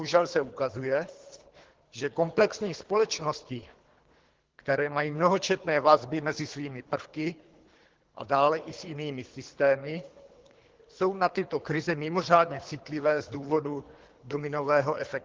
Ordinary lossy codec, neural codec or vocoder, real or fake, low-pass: Opus, 16 kbps; codec, 24 kHz, 3 kbps, HILCodec; fake; 7.2 kHz